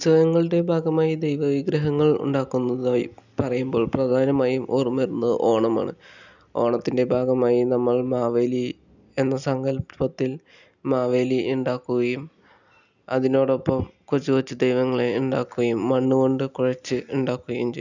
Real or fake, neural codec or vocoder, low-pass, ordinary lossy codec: real; none; 7.2 kHz; none